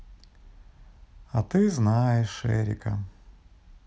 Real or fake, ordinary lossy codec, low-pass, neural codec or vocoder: real; none; none; none